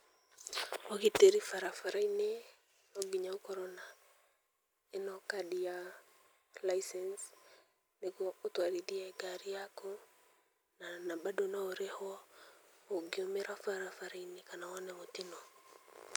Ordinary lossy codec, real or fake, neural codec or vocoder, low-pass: none; real; none; none